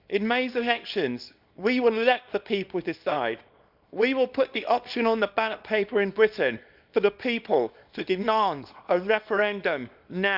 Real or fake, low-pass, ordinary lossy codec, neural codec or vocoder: fake; 5.4 kHz; none; codec, 24 kHz, 0.9 kbps, WavTokenizer, small release